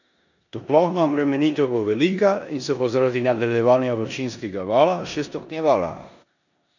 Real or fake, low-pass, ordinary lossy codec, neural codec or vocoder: fake; 7.2 kHz; none; codec, 16 kHz in and 24 kHz out, 0.9 kbps, LongCat-Audio-Codec, four codebook decoder